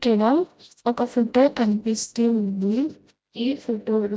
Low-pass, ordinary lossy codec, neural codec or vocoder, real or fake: none; none; codec, 16 kHz, 0.5 kbps, FreqCodec, smaller model; fake